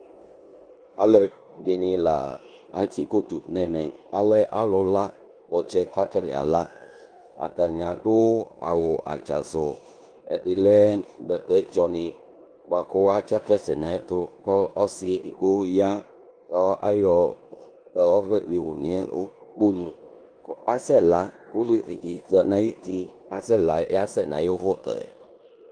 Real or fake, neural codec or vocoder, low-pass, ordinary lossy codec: fake; codec, 16 kHz in and 24 kHz out, 0.9 kbps, LongCat-Audio-Codec, four codebook decoder; 9.9 kHz; Opus, 32 kbps